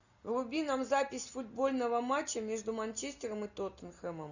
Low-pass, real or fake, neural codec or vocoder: 7.2 kHz; real; none